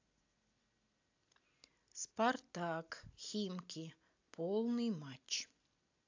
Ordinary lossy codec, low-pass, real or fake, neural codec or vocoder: AAC, 48 kbps; 7.2 kHz; real; none